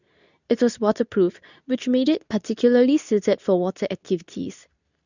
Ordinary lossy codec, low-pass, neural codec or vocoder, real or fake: none; 7.2 kHz; codec, 24 kHz, 0.9 kbps, WavTokenizer, medium speech release version 2; fake